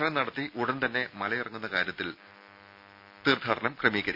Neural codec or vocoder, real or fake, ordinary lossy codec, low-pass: none; real; none; 5.4 kHz